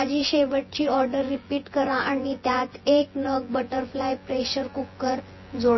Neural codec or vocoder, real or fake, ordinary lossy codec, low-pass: vocoder, 24 kHz, 100 mel bands, Vocos; fake; MP3, 24 kbps; 7.2 kHz